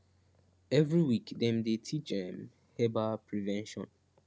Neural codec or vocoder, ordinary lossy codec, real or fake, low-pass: none; none; real; none